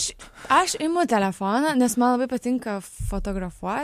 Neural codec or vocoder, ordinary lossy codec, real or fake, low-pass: none; MP3, 64 kbps; real; 14.4 kHz